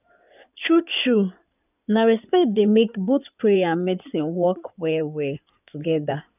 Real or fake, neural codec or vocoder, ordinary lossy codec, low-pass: fake; codec, 16 kHz in and 24 kHz out, 2.2 kbps, FireRedTTS-2 codec; none; 3.6 kHz